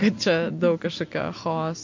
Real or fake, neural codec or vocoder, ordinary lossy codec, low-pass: real; none; MP3, 48 kbps; 7.2 kHz